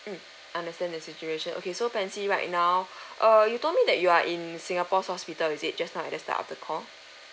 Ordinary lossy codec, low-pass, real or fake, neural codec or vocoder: none; none; real; none